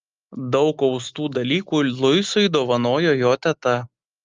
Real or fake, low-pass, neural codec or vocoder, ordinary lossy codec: real; 7.2 kHz; none; Opus, 32 kbps